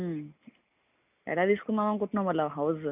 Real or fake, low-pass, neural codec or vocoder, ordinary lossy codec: real; 3.6 kHz; none; none